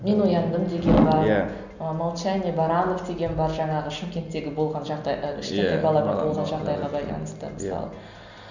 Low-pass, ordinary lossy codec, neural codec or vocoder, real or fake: 7.2 kHz; none; none; real